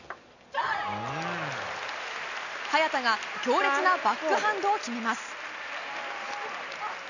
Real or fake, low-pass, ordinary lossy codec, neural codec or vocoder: real; 7.2 kHz; none; none